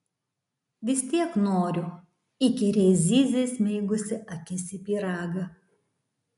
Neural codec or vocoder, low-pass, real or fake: none; 10.8 kHz; real